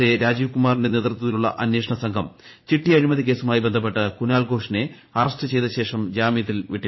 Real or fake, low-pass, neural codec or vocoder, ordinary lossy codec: fake; 7.2 kHz; vocoder, 44.1 kHz, 80 mel bands, Vocos; MP3, 24 kbps